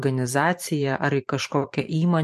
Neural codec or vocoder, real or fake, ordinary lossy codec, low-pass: vocoder, 44.1 kHz, 128 mel bands every 512 samples, BigVGAN v2; fake; MP3, 64 kbps; 14.4 kHz